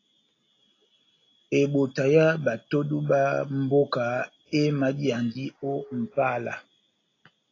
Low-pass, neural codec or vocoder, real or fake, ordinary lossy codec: 7.2 kHz; none; real; AAC, 32 kbps